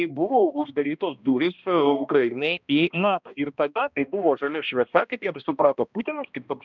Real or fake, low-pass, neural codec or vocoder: fake; 7.2 kHz; codec, 16 kHz, 1 kbps, X-Codec, HuBERT features, trained on balanced general audio